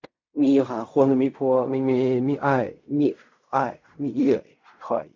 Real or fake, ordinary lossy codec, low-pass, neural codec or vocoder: fake; MP3, 48 kbps; 7.2 kHz; codec, 16 kHz in and 24 kHz out, 0.4 kbps, LongCat-Audio-Codec, fine tuned four codebook decoder